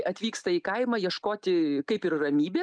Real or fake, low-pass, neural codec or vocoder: real; 9.9 kHz; none